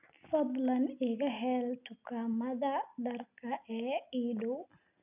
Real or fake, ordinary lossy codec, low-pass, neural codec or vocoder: real; none; 3.6 kHz; none